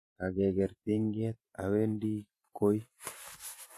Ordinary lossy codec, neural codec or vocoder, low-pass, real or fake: AAC, 64 kbps; vocoder, 44.1 kHz, 128 mel bands every 512 samples, BigVGAN v2; 14.4 kHz; fake